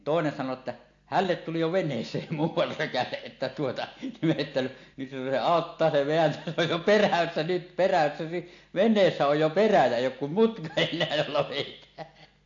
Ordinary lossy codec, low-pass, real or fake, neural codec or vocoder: AAC, 48 kbps; 7.2 kHz; real; none